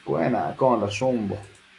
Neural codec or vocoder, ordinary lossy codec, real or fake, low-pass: autoencoder, 48 kHz, 128 numbers a frame, DAC-VAE, trained on Japanese speech; AAC, 48 kbps; fake; 10.8 kHz